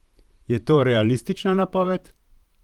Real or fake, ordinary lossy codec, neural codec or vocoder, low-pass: fake; Opus, 24 kbps; vocoder, 44.1 kHz, 128 mel bands, Pupu-Vocoder; 19.8 kHz